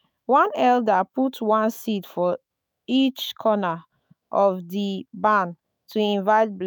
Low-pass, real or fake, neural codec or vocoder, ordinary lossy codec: none; fake; autoencoder, 48 kHz, 128 numbers a frame, DAC-VAE, trained on Japanese speech; none